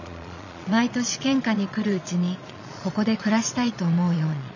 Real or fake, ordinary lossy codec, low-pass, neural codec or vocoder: fake; none; 7.2 kHz; vocoder, 22.05 kHz, 80 mel bands, Vocos